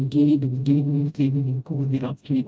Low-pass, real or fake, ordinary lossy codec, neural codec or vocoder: none; fake; none; codec, 16 kHz, 0.5 kbps, FreqCodec, smaller model